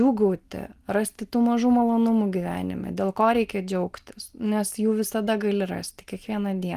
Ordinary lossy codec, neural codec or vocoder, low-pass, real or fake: Opus, 24 kbps; none; 14.4 kHz; real